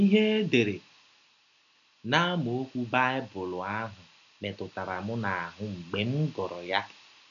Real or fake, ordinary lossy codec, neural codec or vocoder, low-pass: real; none; none; 7.2 kHz